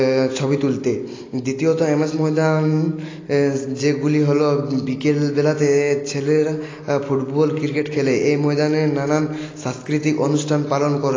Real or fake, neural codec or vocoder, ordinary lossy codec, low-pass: real; none; AAC, 32 kbps; 7.2 kHz